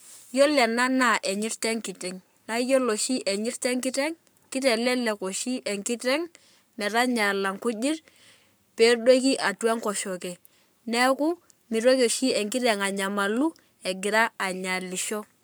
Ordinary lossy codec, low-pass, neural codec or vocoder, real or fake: none; none; codec, 44.1 kHz, 7.8 kbps, Pupu-Codec; fake